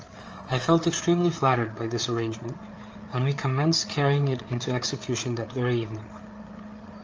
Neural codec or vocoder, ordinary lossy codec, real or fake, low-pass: codec, 16 kHz, 8 kbps, FreqCodec, larger model; Opus, 24 kbps; fake; 7.2 kHz